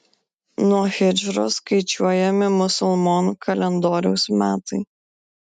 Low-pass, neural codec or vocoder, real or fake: 9.9 kHz; none; real